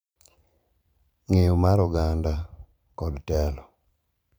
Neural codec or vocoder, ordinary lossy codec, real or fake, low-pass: vocoder, 44.1 kHz, 128 mel bands every 512 samples, BigVGAN v2; none; fake; none